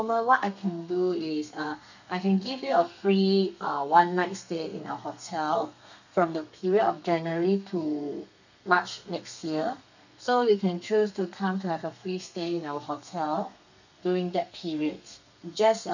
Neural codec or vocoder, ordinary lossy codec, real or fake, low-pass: codec, 32 kHz, 1.9 kbps, SNAC; none; fake; 7.2 kHz